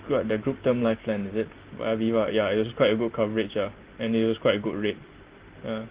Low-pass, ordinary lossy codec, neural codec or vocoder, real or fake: 3.6 kHz; Opus, 16 kbps; none; real